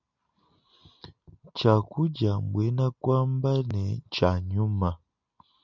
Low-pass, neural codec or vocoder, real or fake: 7.2 kHz; none; real